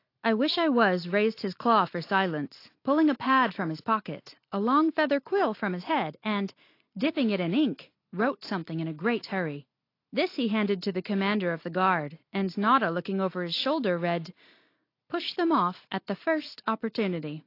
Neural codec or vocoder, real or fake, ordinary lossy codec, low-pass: none; real; AAC, 32 kbps; 5.4 kHz